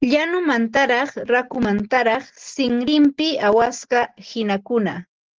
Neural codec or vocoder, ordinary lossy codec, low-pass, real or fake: none; Opus, 16 kbps; 7.2 kHz; real